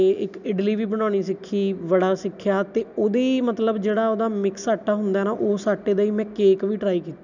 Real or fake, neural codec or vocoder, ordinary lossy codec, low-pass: real; none; none; 7.2 kHz